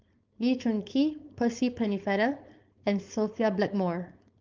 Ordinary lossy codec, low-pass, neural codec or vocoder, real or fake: Opus, 24 kbps; 7.2 kHz; codec, 16 kHz, 4.8 kbps, FACodec; fake